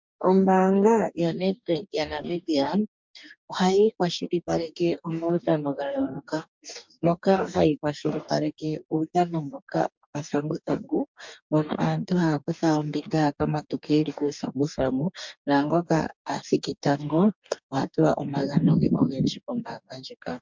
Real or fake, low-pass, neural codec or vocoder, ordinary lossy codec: fake; 7.2 kHz; codec, 44.1 kHz, 2.6 kbps, DAC; MP3, 64 kbps